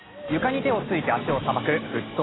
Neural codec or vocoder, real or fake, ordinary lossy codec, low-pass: none; real; AAC, 16 kbps; 7.2 kHz